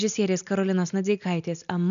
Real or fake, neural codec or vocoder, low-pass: real; none; 7.2 kHz